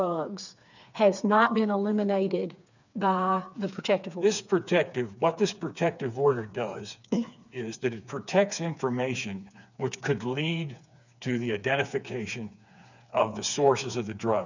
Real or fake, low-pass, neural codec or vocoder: fake; 7.2 kHz; codec, 16 kHz, 4 kbps, FreqCodec, smaller model